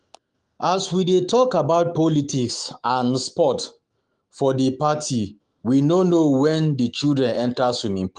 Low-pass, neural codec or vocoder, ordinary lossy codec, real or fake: 10.8 kHz; autoencoder, 48 kHz, 128 numbers a frame, DAC-VAE, trained on Japanese speech; Opus, 24 kbps; fake